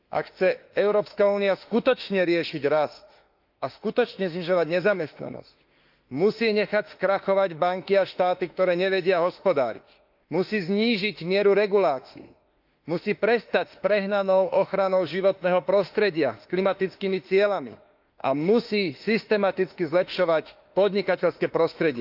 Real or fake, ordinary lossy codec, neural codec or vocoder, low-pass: fake; Opus, 32 kbps; autoencoder, 48 kHz, 32 numbers a frame, DAC-VAE, trained on Japanese speech; 5.4 kHz